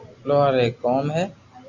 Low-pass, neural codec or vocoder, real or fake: 7.2 kHz; none; real